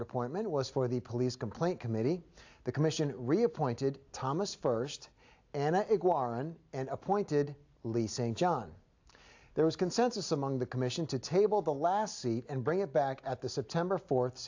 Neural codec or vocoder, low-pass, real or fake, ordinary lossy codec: none; 7.2 kHz; real; AAC, 48 kbps